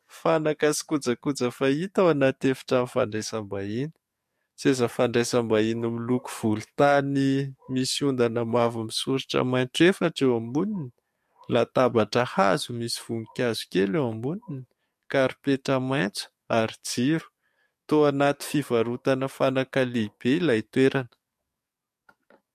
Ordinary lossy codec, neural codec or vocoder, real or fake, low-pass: MP3, 64 kbps; codec, 44.1 kHz, 7.8 kbps, DAC; fake; 14.4 kHz